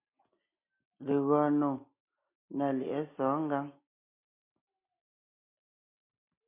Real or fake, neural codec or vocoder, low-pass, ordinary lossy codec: real; none; 3.6 kHz; MP3, 24 kbps